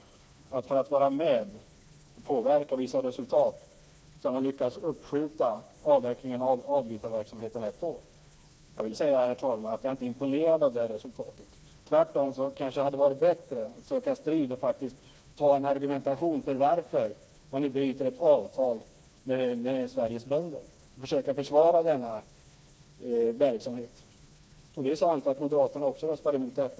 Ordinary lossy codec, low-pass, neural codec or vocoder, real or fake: none; none; codec, 16 kHz, 2 kbps, FreqCodec, smaller model; fake